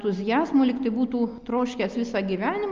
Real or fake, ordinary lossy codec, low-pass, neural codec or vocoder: real; Opus, 24 kbps; 7.2 kHz; none